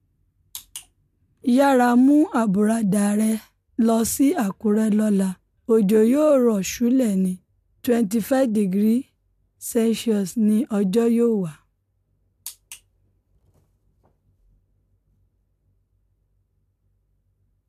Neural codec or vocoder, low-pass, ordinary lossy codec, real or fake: none; 14.4 kHz; MP3, 96 kbps; real